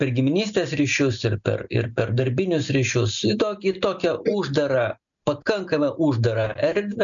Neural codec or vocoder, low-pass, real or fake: none; 7.2 kHz; real